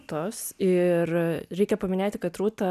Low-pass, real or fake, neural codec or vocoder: 14.4 kHz; real; none